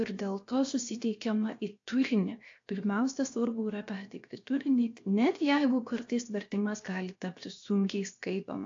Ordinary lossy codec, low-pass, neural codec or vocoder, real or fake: AAC, 48 kbps; 7.2 kHz; codec, 16 kHz, 0.7 kbps, FocalCodec; fake